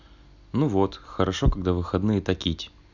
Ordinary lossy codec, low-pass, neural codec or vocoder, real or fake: none; 7.2 kHz; none; real